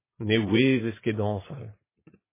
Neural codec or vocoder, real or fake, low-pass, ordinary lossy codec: vocoder, 44.1 kHz, 80 mel bands, Vocos; fake; 3.6 kHz; MP3, 16 kbps